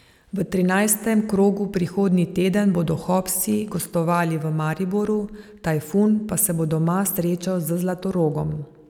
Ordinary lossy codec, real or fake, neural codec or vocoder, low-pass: none; real; none; 19.8 kHz